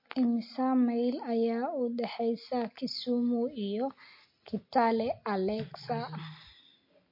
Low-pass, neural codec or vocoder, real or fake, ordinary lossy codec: 5.4 kHz; none; real; MP3, 32 kbps